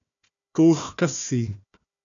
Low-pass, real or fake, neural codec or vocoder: 7.2 kHz; fake; codec, 16 kHz, 1 kbps, FunCodec, trained on Chinese and English, 50 frames a second